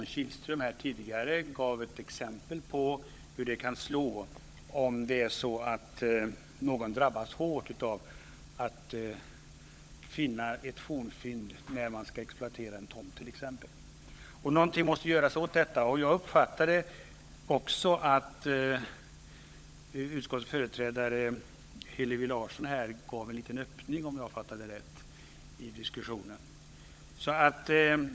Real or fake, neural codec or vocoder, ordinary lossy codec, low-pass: fake; codec, 16 kHz, 16 kbps, FunCodec, trained on LibriTTS, 50 frames a second; none; none